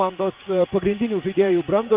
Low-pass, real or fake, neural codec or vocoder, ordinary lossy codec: 3.6 kHz; fake; vocoder, 44.1 kHz, 80 mel bands, Vocos; Opus, 32 kbps